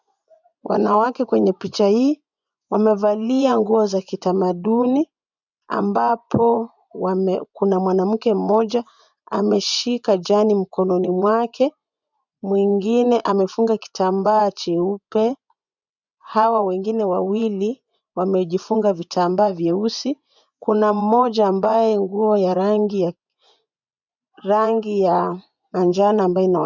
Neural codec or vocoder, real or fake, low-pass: vocoder, 24 kHz, 100 mel bands, Vocos; fake; 7.2 kHz